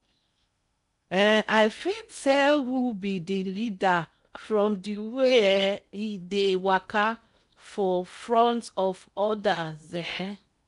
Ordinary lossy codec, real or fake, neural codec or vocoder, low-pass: none; fake; codec, 16 kHz in and 24 kHz out, 0.8 kbps, FocalCodec, streaming, 65536 codes; 10.8 kHz